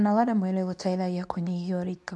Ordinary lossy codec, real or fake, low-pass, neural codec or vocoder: none; fake; none; codec, 24 kHz, 0.9 kbps, WavTokenizer, medium speech release version 2